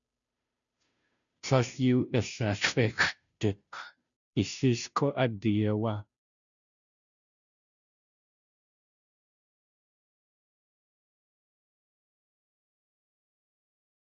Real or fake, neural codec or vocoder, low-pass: fake; codec, 16 kHz, 0.5 kbps, FunCodec, trained on Chinese and English, 25 frames a second; 7.2 kHz